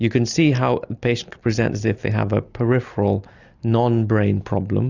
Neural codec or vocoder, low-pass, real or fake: none; 7.2 kHz; real